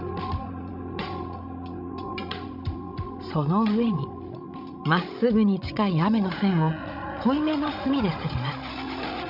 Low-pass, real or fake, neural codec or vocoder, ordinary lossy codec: 5.4 kHz; fake; codec, 16 kHz, 16 kbps, FreqCodec, larger model; none